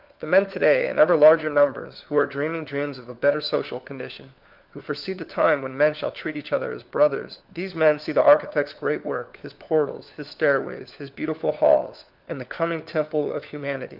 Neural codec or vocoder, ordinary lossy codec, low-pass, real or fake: codec, 16 kHz, 4 kbps, FunCodec, trained on LibriTTS, 50 frames a second; Opus, 32 kbps; 5.4 kHz; fake